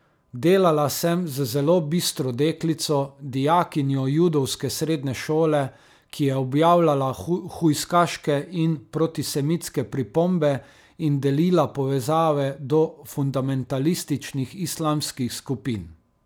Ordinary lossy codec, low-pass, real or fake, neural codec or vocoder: none; none; real; none